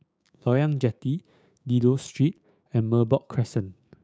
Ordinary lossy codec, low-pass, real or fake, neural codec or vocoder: none; none; fake; codec, 16 kHz, 6 kbps, DAC